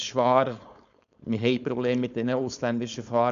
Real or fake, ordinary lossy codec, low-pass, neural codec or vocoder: fake; none; 7.2 kHz; codec, 16 kHz, 4.8 kbps, FACodec